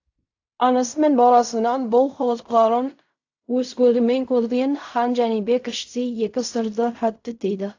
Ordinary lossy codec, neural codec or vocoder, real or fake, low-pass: AAC, 48 kbps; codec, 16 kHz in and 24 kHz out, 0.4 kbps, LongCat-Audio-Codec, fine tuned four codebook decoder; fake; 7.2 kHz